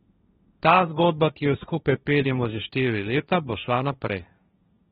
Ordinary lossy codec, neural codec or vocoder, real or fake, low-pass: AAC, 16 kbps; codec, 16 kHz, 1.1 kbps, Voila-Tokenizer; fake; 7.2 kHz